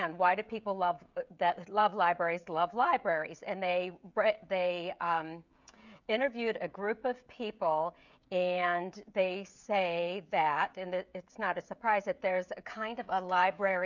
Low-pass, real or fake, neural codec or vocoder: 7.2 kHz; fake; codec, 16 kHz, 16 kbps, FreqCodec, smaller model